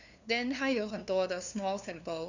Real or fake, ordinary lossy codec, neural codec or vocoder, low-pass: fake; none; codec, 16 kHz, 2 kbps, FunCodec, trained on LibriTTS, 25 frames a second; 7.2 kHz